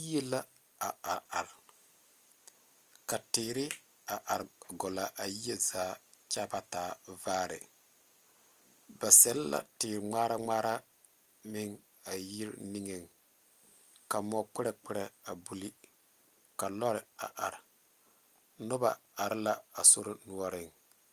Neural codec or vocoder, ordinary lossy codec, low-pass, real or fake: none; Opus, 32 kbps; 14.4 kHz; real